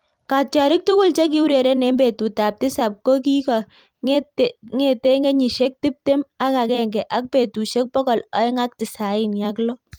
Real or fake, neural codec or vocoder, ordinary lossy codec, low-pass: fake; vocoder, 44.1 kHz, 128 mel bands every 256 samples, BigVGAN v2; Opus, 32 kbps; 19.8 kHz